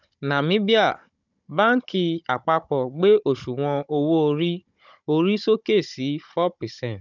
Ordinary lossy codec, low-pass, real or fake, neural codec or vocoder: none; 7.2 kHz; fake; codec, 16 kHz, 16 kbps, FunCodec, trained on Chinese and English, 50 frames a second